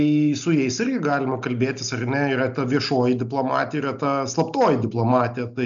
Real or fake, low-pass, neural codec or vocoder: real; 7.2 kHz; none